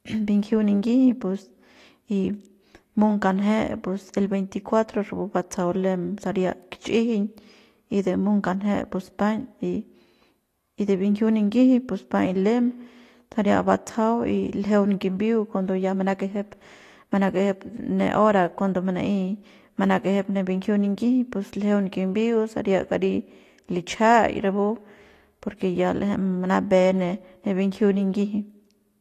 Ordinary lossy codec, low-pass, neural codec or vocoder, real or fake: AAC, 48 kbps; 14.4 kHz; none; real